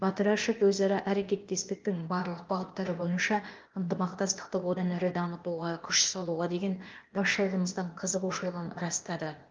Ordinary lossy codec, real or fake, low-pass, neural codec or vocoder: Opus, 32 kbps; fake; 7.2 kHz; codec, 16 kHz, 0.8 kbps, ZipCodec